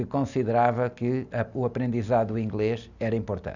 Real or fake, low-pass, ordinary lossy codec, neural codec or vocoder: real; 7.2 kHz; none; none